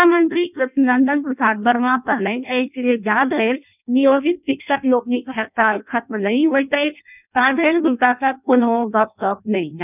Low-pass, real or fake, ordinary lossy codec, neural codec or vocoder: 3.6 kHz; fake; none; codec, 16 kHz in and 24 kHz out, 0.6 kbps, FireRedTTS-2 codec